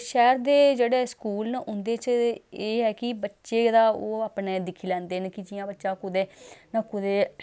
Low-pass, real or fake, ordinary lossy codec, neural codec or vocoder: none; real; none; none